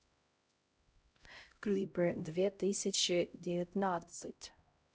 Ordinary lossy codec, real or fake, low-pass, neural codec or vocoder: none; fake; none; codec, 16 kHz, 0.5 kbps, X-Codec, HuBERT features, trained on LibriSpeech